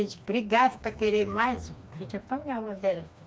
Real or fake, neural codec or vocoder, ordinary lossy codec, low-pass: fake; codec, 16 kHz, 2 kbps, FreqCodec, smaller model; none; none